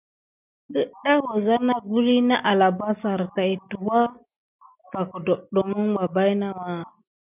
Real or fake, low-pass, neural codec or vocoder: real; 3.6 kHz; none